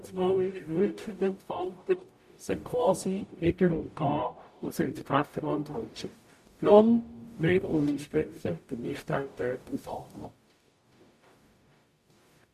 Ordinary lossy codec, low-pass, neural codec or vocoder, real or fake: MP3, 64 kbps; 14.4 kHz; codec, 44.1 kHz, 0.9 kbps, DAC; fake